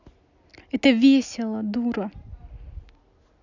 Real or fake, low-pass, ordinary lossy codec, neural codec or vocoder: real; 7.2 kHz; none; none